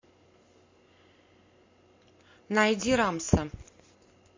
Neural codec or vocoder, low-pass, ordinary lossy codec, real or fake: none; 7.2 kHz; MP3, 48 kbps; real